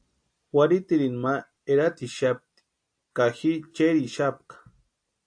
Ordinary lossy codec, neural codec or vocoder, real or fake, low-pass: AAC, 64 kbps; none; real; 9.9 kHz